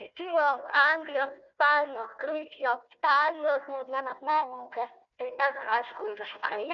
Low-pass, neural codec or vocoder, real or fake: 7.2 kHz; codec, 16 kHz, 1 kbps, FunCodec, trained on Chinese and English, 50 frames a second; fake